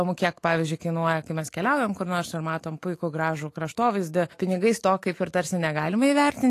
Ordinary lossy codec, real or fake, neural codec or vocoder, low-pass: AAC, 48 kbps; fake; autoencoder, 48 kHz, 128 numbers a frame, DAC-VAE, trained on Japanese speech; 14.4 kHz